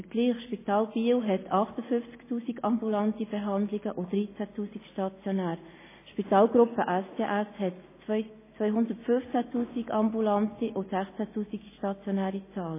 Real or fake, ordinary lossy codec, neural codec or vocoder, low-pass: real; MP3, 16 kbps; none; 3.6 kHz